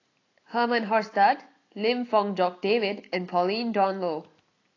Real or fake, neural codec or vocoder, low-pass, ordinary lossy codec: real; none; 7.2 kHz; AAC, 32 kbps